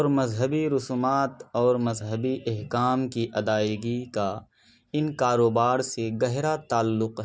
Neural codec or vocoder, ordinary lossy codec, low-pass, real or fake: none; none; none; real